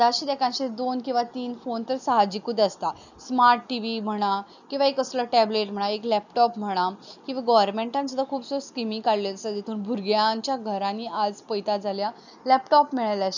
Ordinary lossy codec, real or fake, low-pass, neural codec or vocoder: none; real; 7.2 kHz; none